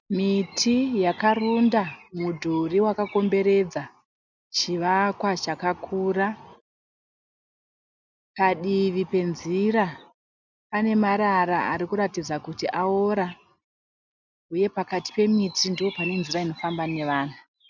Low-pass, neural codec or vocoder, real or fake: 7.2 kHz; none; real